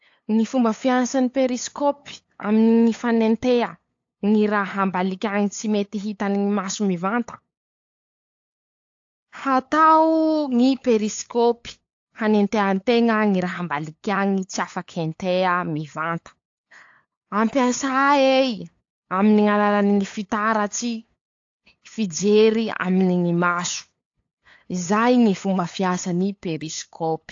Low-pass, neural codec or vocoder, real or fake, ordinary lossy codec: 7.2 kHz; codec, 16 kHz, 8 kbps, FunCodec, trained on LibriTTS, 25 frames a second; fake; AAC, 48 kbps